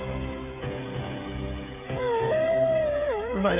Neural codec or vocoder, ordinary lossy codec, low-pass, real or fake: codec, 16 kHz, 16 kbps, FreqCodec, smaller model; none; 3.6 kHz; fake